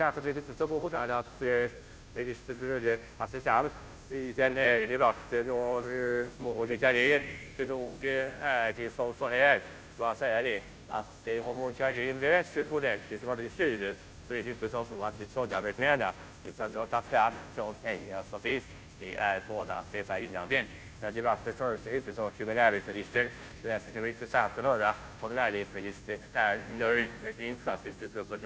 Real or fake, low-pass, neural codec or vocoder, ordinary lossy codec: fake; none; codec, 16 kHz, 0.5 kbps, FunCodec, trained on Chinese and English, 25 frames a second; none